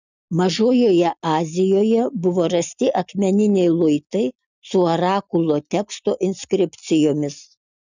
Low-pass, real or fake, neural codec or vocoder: 7.2 kHz; real; none